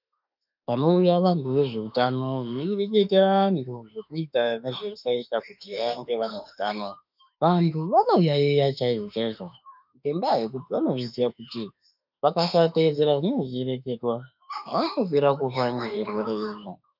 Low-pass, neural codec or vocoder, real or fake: 5.4 kHz; autoencoder, 48 kHz, 32 numbers a frame, DAC-VAE, trained on Japanese speech; fake